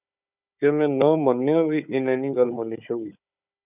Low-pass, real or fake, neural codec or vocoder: 3.6 kHz; fake; codec, 16 kHz, 4 kbps, FunCodec, trained on Chinese and English, 50 frames a second